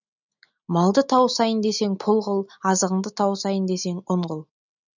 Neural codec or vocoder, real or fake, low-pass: none; real; 7.2 kHz